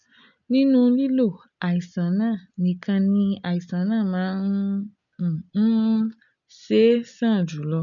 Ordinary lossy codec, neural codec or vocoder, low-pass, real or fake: none; none; 7.2 kHz; real